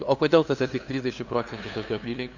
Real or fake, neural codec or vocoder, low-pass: fake; codec, 16 kHz, 2 kbps, FunCodec, trained on LibriTTS, 25 frames a second; 7.2 kHz